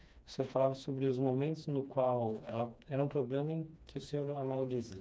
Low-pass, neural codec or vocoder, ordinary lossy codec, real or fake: none; codec, 16 kHz, 2 kbps, FreqCodec, smaller model; none; fake